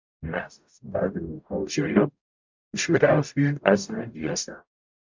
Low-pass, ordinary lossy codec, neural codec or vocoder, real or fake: 7.2 kHz; MP3, 64 kbps; codec, 44.1 kHz, 0.9 kbps, DAC; fake